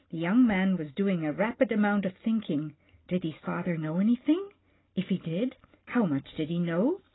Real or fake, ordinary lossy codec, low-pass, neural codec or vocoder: real; AAC, 16 kbps; 7.2 kHz; none